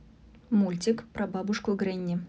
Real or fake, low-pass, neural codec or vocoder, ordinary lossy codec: real; none; none; none